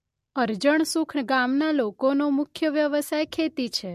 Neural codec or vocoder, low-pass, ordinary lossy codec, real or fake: none; 14.4 kHz; MP3, 64 kbps; real